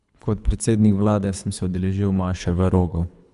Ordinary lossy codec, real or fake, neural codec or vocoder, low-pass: none; fake; codec, 24 kHz, 3 kbps, HILCodec; 10.8 kHz